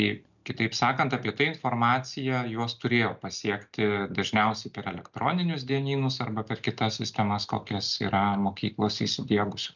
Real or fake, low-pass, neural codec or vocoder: real; 7.2 kHz; none